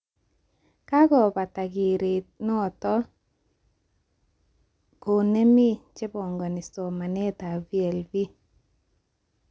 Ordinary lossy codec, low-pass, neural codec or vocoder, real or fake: none; none; none; real